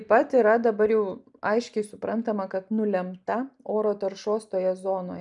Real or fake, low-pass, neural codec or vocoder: real; 10.8 kHz; none